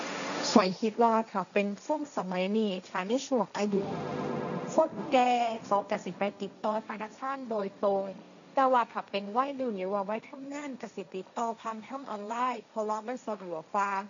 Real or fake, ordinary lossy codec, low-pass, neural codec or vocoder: fake; none; 7.2 kHz; codec, 16 kHz, 1.1 kbps, Voila-Tokenizer